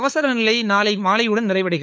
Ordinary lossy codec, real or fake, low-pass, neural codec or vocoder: none; fake; none; codec, 16 kHz, 4 kbps, FunCodec, trained on LibriTTS, 50 frames a second